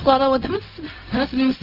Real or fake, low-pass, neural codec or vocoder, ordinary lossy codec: fake; 5.4 kHz; codec, 16 kHz, 0.4 kbps, LongCat-Audio-Codec; Opus, 24 kbps